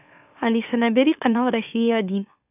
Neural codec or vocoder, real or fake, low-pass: autoencoder, 44.1 kHz, a latent of 192 numbers a frame, MeloTTS; fake; 3.6 kHz